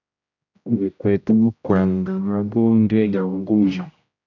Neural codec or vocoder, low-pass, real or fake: codec, 16 kHz, 0.5 kbps, X-Codec, HuBERT features, trained on general audio; 7.2 kHz; fake